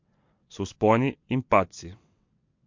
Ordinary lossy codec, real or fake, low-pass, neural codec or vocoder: MP3, 48 kbps; fake; 7.2 kHz; vocoder, 22.05 kHz, 80 mel bands, WaveNeXt